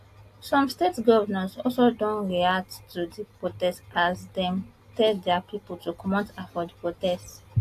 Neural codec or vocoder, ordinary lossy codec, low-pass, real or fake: none; AAC, 64 kbps; 14.4 kHz; real